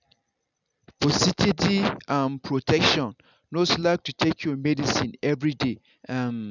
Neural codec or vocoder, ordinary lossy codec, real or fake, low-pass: none; none; real; 7.2 kHz